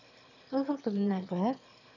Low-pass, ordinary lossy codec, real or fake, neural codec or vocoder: 7.2 kHz; none; fake; autoencoder, 22.05 kHz, a latent of 192 numbers a frame, VITS, trained on one speaker